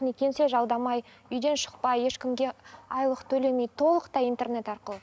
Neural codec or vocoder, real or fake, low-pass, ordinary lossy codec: none; real; none; none